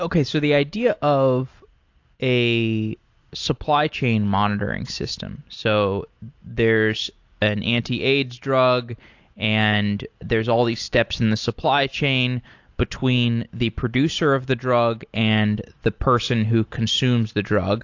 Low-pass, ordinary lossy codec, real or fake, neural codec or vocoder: 7.2 kHz; AAC, 48 kbps; real; none